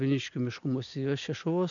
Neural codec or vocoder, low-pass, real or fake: none; 7.2 kHz; real